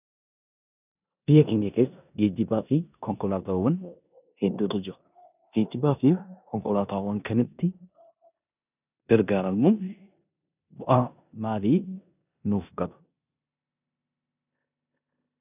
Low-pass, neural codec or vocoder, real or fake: 3.6 kHz; codec, 16 kHz in and 24 kHz out, 0.9 kbps, LongCat-Audio-Codec, four codebook decoder; fake